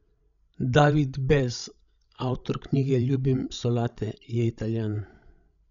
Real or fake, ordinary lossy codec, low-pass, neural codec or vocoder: fake; none; 7.2 kHz; codec, 16 kHz, 16 kbps, FreqCodec, larger model